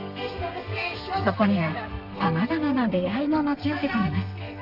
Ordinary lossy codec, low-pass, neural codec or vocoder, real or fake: none; 5.4 kHz; codec, 32 kHz, 1.9 kbps, SNAC; fake